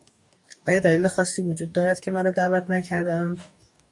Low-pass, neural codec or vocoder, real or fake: 10.8 kHz; codec, 44.1 kHz, 2.6 kbps, DAC; fake